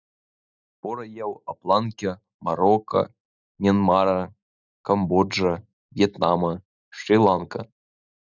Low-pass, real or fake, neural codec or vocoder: 7.2 kHz; real; none